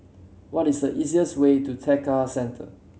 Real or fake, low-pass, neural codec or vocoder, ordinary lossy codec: real; none; none; none